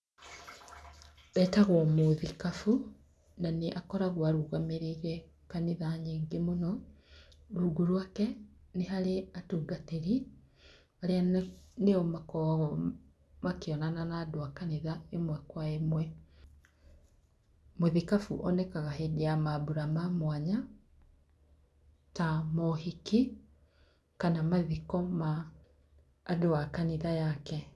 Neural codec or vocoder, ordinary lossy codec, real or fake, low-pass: none; none; real; none